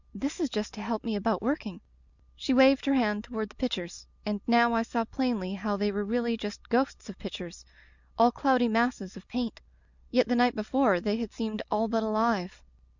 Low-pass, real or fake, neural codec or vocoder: 7.2 kHz; real; none